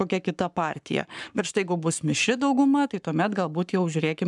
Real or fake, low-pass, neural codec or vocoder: fake; 10.8 kHz; codec, 44.1 kHz, 7.8 kbps, Pupu-Codec